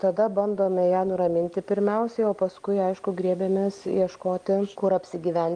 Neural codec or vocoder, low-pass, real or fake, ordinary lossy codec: none; 9.9 kHz; real; Opus, 32 kbps